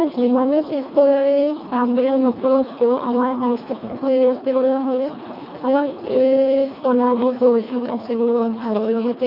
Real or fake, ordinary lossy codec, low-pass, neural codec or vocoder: fake; none; 5.4 kHz; codec, 24 kHz, 1.5 kbps, HILCodec